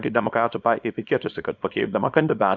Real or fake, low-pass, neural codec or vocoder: fake; 7.2 kHz; codec, 24 kHz, 0.9 kbps, WavTokenizer, small release